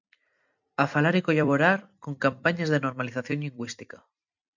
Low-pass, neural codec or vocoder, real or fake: 7.2 kHz; vocoder, 44.1 kHz, 128 mel bands every 256 samples, BigVGAN v2; fake